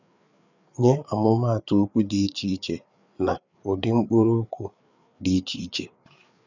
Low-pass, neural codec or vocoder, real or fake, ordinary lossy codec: 7.2 kHz; codec, 16 kHz, 4 kbps, FreqCodec, larger model; fake; none